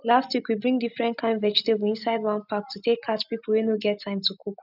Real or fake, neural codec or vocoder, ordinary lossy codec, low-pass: real; none; none; 5.4 kHz